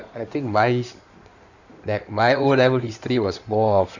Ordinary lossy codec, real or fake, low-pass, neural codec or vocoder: none; fake; 7.2 kHz; codec, 16 kHz in and 24 kHz out, 2.2 kbps, FireRedTTS-2 codec